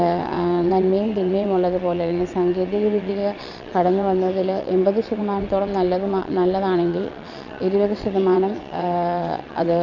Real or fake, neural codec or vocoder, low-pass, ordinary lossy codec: fake; vocoder, 22.05 kHz, 80 mel bands, WaveNeXt; 7.2 kHz; none